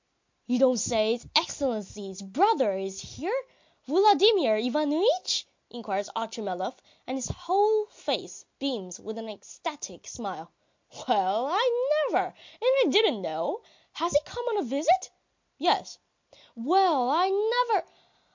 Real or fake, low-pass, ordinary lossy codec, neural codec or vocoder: real; 7.2 kHz; MP3, 48 kbps; none